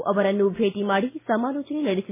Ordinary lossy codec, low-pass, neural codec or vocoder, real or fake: MP3, 16 kbps; 3.6 kHz; none; real